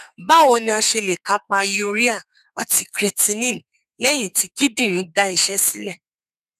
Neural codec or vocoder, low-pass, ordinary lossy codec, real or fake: codec, 32 kHz, 1.9 kbps, SNAC; 14.4 kHz; none; fake